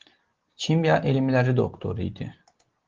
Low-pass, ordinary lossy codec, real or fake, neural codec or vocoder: 7.2 kHz; Opus, 16 kbps; real; none